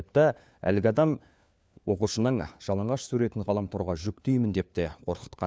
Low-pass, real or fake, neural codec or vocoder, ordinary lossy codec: none; fake; codec, 16 kHz, 4 kbps, FunCodec, trained on LibriTTS, 50 frames a second; none